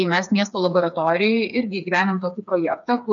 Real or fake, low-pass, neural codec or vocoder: fake; 7.2 kHz; codec, 16 kHz, 4 kbps, FreqCodec, smaller model